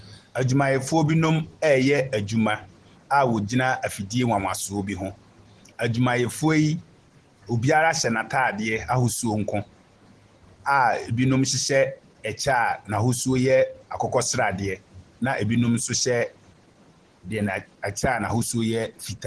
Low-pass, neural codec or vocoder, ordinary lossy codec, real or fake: 10.8 kHz; none; Opus, 16 kbps; real